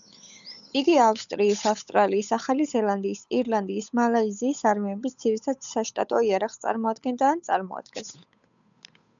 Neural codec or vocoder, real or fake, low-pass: codec, 16 kHz, 8 kbps, FunCodec, trained on Chinese and English, 25 frames a second; fake; 7.2 kHz